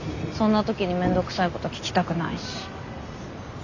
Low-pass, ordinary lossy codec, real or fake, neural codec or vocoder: 7.2 kHz; none; real; none